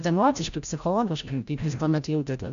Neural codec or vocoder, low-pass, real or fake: codec, 16 kHz, 0.5 kbps, FreqCodec, larger model; 7.2 kHz; fake